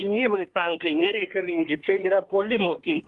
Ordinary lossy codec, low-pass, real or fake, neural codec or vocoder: Opus, 24 kbps; 10.8 kHz; fake; codec, 24 kHz, 1 kbps, SNAC